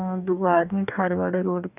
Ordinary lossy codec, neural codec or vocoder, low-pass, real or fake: none; codec, 44.1 kHz, 2.6 kbps, DAC; 3.6 kHz; fake